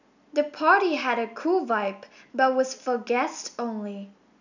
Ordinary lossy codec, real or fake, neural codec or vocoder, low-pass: none; real; none; 7.2 kHz